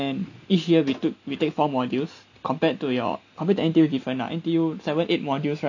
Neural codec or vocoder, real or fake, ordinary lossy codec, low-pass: none; real; none; 7.2 kHz